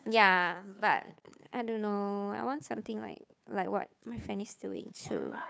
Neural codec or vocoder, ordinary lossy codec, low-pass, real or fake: codec, 16 kHz, 2 kbps, FunCodec, trained on Chinese and English, 25 frames a second; none; none; fake